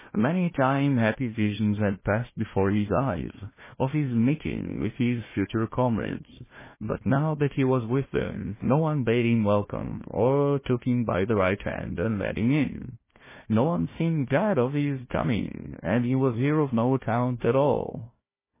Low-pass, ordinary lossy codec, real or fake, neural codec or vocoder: 3.6 kHz; MP3, 16 kbps; fake; codec, 16 kHz, 1 kbps, FunCodec, trained on Chinese and English, 50 frames a second